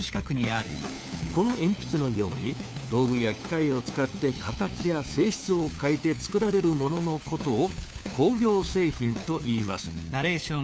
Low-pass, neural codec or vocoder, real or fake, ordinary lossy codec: none; codec, 16 kHz, 4 kbps, FunCodec, trained on LibriTTS, 50 frames a second; fake; none